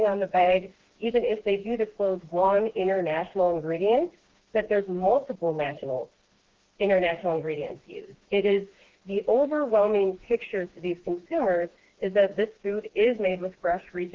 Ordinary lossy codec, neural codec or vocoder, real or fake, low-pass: Opus, 16 kbps; codec, 16 kHz, 2 kbps, FreqCodec, smaller model; fake; 7.2 kHz